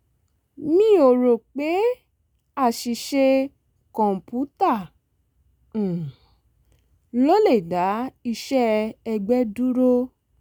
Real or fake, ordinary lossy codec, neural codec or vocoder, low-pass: real; none; none; none